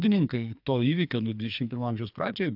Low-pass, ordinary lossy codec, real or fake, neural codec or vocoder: 5.4 kHz; AAC, 48 kbps; fake; codec, 44.1 kHz, 2.6 kbps, SNAC